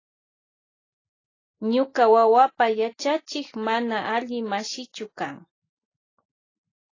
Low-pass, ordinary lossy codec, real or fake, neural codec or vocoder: 7.2 kHz; AAC, 32 kbps; real; none